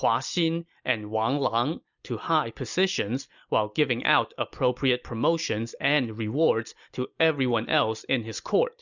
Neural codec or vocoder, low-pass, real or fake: none; 7.2 kHz; real